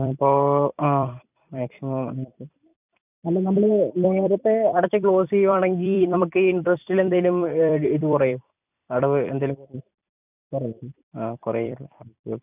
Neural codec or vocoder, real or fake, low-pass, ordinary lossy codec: vocoder, 44.1 kHz, 128 mel bands every 256 samples, BigVGAN v2; fake; 3.6 kHz; none